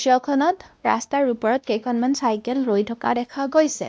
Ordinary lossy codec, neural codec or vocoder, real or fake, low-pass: none; codec, 16 kHz, 1 kbps, X-Codec, WavLM features, trained on Multilingual LibriSpeech; fake; none